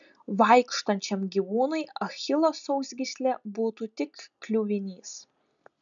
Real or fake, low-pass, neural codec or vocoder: real; 7.2 kHz; none